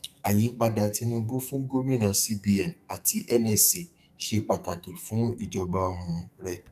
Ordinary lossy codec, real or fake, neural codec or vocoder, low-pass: none; fake; codec, 44.1 kHz, 2.6 kbps, SNAC; 14.4 kHz